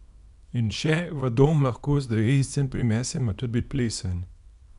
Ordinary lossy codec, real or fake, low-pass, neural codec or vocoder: none; fake; 10.8 kHz; codec, 24 kHz, 0.9 kbps, WavTokenizer, small release